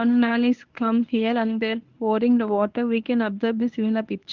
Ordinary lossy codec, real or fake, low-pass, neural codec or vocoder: Opus, 24 kbps; fake; 7.2 kHz; codec, 24 kHz, 0.9 kbps, WavTokenizer, medium speech release version 1